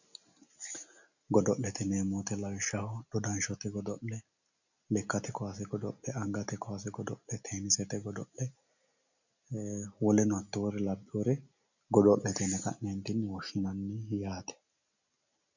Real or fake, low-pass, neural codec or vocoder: real; 7.2 kHz; none